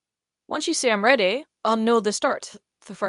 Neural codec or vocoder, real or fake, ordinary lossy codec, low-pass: codec, 24 kHz, 0.9 kbps, WavTokenizer, medium speech release version 2; fake; none; 10.8 kHz